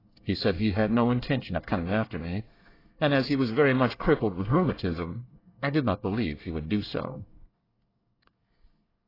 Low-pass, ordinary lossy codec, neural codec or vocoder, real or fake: 5.4 kHz; AAC, 24 kbps; codec, 24 kHz, 1 kbps, SNAC; fake